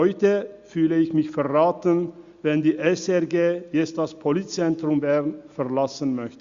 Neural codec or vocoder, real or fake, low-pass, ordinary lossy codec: none; real; 7.2 kHz; Opus, 64 kbps